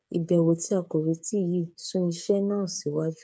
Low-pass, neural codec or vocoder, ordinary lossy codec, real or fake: none; codec, 16 kHz, 8 kbps, FreqCodec, smaller model; none; fake